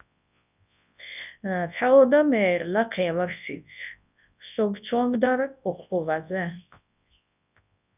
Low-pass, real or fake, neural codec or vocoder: 3.6 kHz; fake; codec, 24 kHz, 0.9 kbps, WavTokenizer, large speech release